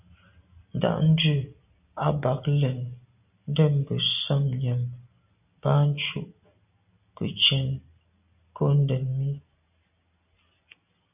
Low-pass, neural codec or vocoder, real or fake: 3.6 kHz; none; real